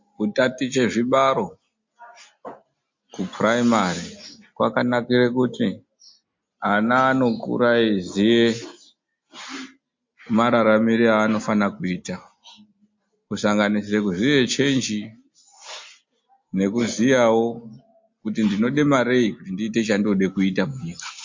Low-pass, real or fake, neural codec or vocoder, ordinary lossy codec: 7.2 kHz; real; none; MP3, 48 kbps